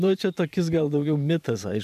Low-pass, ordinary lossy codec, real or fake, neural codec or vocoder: 14.4 kHz; AAC, 96 kbps; fake; vocoder, 44.1 kHz, 128 mel bands every 512 samples, BigVGAN v2